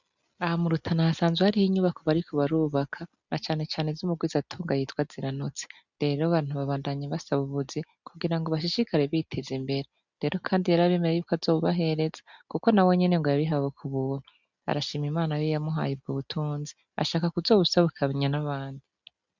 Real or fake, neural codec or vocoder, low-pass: real; none; 7.2 kHz